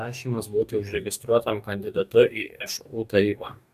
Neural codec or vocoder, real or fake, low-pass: codec, 44.1 kHz, 2.6 kbps, DAC; fake; 14.4 kHz